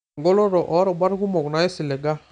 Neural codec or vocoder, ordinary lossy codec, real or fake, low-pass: none; none; real; 9.9 kHz